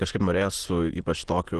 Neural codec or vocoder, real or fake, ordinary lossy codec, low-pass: autoencoder, 22.05 kHz, a latent of 192 numbers a frame, VITS, trained on many speakers; fake; Opus, 16 kbps; 9.9 kHz